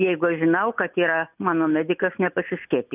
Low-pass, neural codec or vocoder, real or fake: 3.6 kHz; none; real